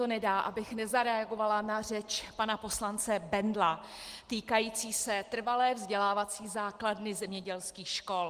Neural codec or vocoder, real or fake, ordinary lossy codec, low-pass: none; real; Opus, 24 kbps; 14.4 kHz